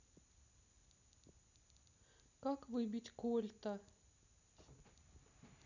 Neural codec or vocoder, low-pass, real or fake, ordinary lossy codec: none; 7.2 kHz; real; none